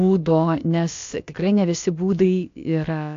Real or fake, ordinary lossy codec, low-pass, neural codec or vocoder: fake; AAC, 48 kbps; 7.2 kHz; codec, 16 kHz, about 1 kbps, DyCAST, with the encoder's durations